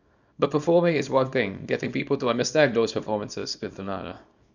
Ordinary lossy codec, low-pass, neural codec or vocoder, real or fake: none; 7.2 kHz; codec, 24 kHz, 0.9 kbps, WavTokenizer, small release; fake